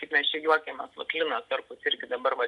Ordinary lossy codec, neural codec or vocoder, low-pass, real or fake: AAC, 64 kbps; none; 10.8 kHz; real